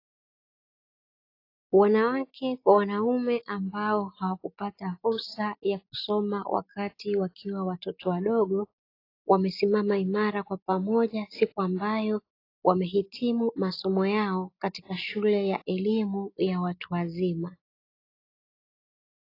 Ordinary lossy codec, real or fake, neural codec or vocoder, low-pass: AAC, 32 kbps; real; none; 5.4 kHz